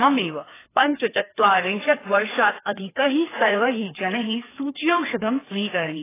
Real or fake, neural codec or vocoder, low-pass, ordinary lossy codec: fake; codec, 16 kHz, 2 kbps, FreqCodec, larger model; 3.6 kHz; AAC, 16 kbps